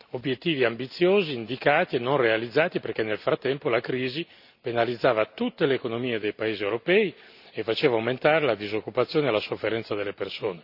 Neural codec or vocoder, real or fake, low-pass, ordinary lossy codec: none; real; 5.4 kHz; none